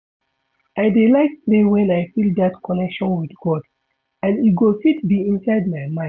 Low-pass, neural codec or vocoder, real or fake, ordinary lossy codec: none; none; real; none